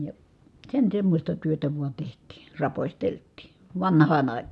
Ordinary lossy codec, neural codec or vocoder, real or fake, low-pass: none; none; real; none